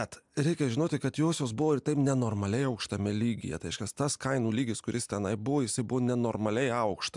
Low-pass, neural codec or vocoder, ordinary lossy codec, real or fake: 10.8 kHz; none; MP3, 96 kbps; real